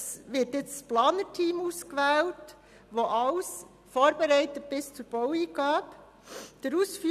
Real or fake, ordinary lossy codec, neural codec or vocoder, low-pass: real; none; none; 14.4 kHz